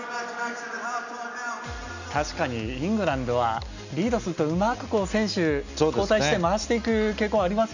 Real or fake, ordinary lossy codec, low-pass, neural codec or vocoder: real; none; 7.2 kHz; none